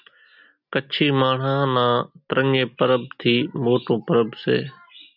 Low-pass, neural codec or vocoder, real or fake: 5.4 kHz; none; real